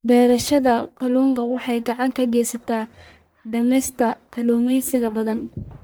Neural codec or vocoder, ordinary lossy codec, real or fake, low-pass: codec, 44.1 kHz, 1.7 kbps, Pupu-Codec; none; fake; none